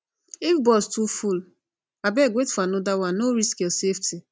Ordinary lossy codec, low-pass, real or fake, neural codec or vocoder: none; none; real; none